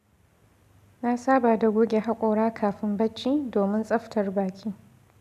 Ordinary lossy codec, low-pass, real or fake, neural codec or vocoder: none; 14.4 kHz; real; none